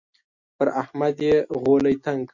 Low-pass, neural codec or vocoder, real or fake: 7.2 kHz; none; real